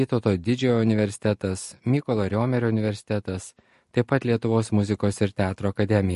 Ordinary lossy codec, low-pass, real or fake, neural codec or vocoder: MP3, 48 kbps; 14.4 kHz; real; none